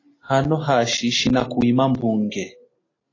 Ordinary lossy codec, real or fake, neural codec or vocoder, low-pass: AAC, 32 kbps; real; none; 7.2 kHz